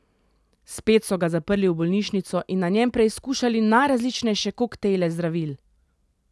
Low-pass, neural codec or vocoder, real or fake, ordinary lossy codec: none; none; real; none